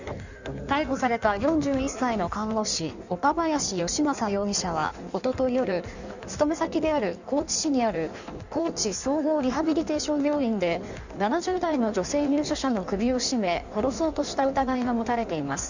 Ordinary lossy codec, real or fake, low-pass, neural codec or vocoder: none; fake; 7.2 kHz; codec, 16 kHz in and 24 kHz out, 1.1 kbps, FireRedTTS-2 codec